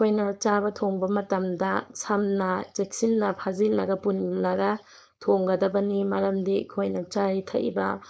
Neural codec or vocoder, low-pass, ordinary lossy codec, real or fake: codec, 16 kHz, 4.8 kbps, FACodec; none; none; fake